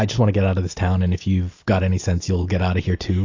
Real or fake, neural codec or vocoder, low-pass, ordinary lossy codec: real; none; 7.2 kHz; AAC, 48 kbps